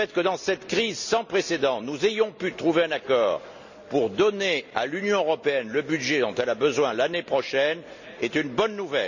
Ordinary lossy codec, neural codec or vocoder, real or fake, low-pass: none; none; real; 7.2 kHz